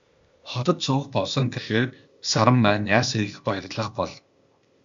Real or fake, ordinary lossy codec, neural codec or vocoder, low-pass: fake; MP3, 64 kbps; codec, 16 kHz, 0.8 kbps, ZipCodec; 7.2 kHz